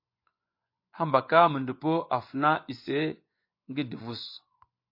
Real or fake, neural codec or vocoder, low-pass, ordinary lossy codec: fake; vocoder, 24 kHz, 100 mel bands, Vocos; 5.4 kHz; MP3, 32 kbps